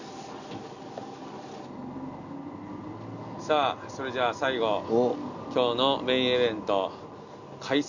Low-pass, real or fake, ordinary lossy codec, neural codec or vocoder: 7.2 kHz; real; none; none